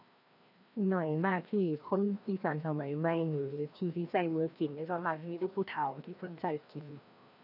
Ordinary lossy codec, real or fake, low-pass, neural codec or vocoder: none; fake; 5.4 kHz; codec, 16 kHz, 1 kbps, FreqCodec, larger model